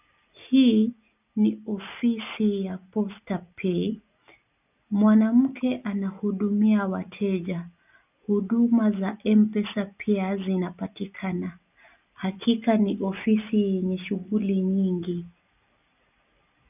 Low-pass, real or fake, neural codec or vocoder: 3.6 kHz; real; none